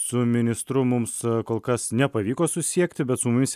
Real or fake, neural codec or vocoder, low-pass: real; none; 14.4 kHz